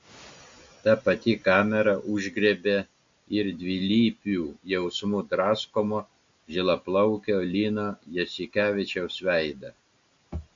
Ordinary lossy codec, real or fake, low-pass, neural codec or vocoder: MP3, 48 kbps; real; 7.2 kHz; none